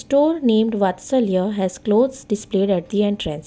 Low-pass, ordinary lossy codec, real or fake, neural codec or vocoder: none; none; real; none